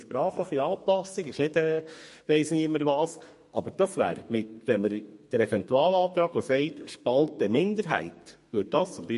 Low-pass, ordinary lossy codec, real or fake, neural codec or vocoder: 14.4 kHz; MP3, 48 kbps; fake; codec, 32 kHz, 1.9 kbps, SNAC